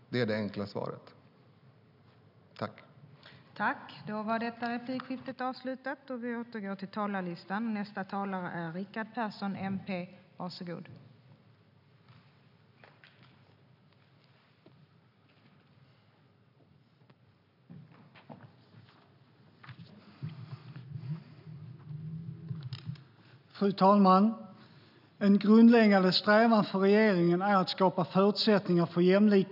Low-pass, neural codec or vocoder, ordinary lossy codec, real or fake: 5.4 kHz; none; none; real